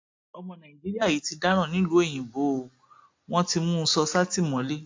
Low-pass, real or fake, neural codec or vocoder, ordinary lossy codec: 7.2 kHz; real; none; MP3, 48 kbps